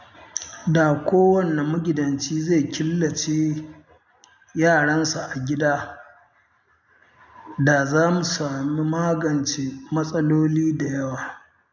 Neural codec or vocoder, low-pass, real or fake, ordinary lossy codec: none; 7.2 kHz; real; none